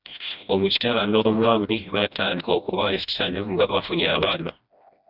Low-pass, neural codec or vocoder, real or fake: 5.4 kHz; codec, 16 kHz, 1 kbps, FreqCodec, smaller model; fake